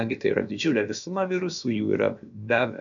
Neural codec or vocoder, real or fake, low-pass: codec, 16 kHz, about 1 kbps, DyCAST, with the encoder's durations; fake; 7.2 kHz